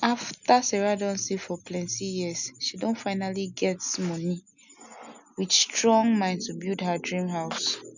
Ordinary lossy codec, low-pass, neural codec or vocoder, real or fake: none; 7.2 kHz; none; real